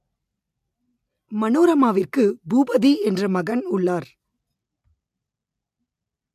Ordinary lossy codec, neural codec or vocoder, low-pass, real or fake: AAC, 96 kbps; none; 14.4 kHz; real